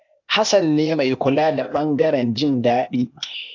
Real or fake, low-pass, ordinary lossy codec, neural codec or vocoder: fake; 7.2 kHz; AAC, 48 kbps; codec, 16 kHz, 0.8 kbps, ZipCodec